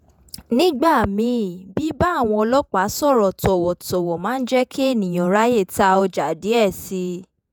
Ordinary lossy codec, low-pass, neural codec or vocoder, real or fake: none; none; vocoder, 48 kHz, 128 mel bands, Vocos; fake